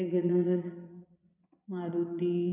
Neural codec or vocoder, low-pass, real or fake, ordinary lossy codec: vocoder, 22.05 kHz, 80 mel bands, Vocos; 3.6 kHz; fake; none